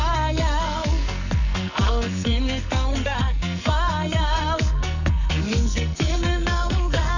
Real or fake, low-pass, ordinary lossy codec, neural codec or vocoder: fake; 7.2 kHz; none; codec, 44.1 kHz, 2.6 kbps, SNAC